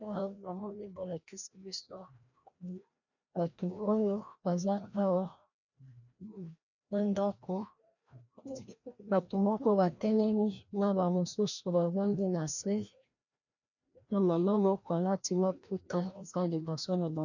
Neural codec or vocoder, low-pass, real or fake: codec, 16 kHz, 1 kbps, FreqCodec, larger model; 7.2 kHz; fake